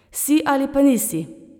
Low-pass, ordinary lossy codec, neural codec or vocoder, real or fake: none; none; none; real